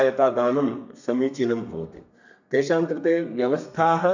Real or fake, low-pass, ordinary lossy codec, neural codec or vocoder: fake; 7.2 kHz; none; codec, 32 kHz, 1.9 kbps, SNAC